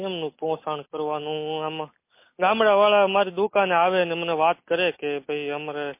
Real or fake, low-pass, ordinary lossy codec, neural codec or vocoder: real; 3.6 kHz; MP3, 24 kbps; none